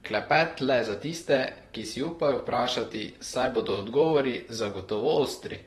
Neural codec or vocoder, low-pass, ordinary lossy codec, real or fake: vocoder, 44.1 kHz, 128 mel bands, Pupu-Vocoder; 19.8 kHz; AAC, 32 kbps; fake